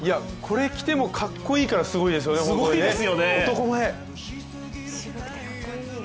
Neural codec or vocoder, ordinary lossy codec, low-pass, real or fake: none; none; none; real